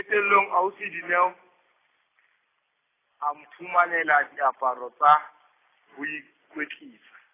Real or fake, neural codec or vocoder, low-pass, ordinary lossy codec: real; none; 3.6 kHz; AAC, 16 kbps